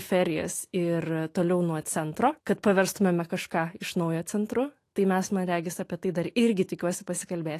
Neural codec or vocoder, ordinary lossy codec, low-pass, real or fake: autoencoder, 48 kHz, 128 numbers a frame, DAC-VAE, trained on Japanese speech; AAC, 48 kbps; 14.4 kHz; fake